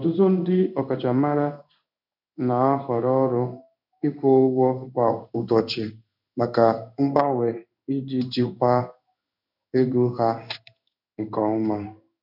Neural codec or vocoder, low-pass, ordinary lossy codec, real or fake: codec, 16 kHz in and 24 kHz out, 1 kbps, XY-Tokenizer; 5.4 kHz; none; fake